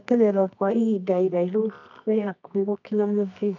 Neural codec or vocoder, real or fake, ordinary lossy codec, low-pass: codec, 24 kHz, 0.9 kbps, WavTokenizer, medium music audio release; fake; none; 7.2 kHz